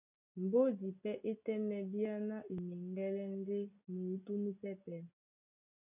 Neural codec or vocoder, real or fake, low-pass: none; real; 3.6 kHz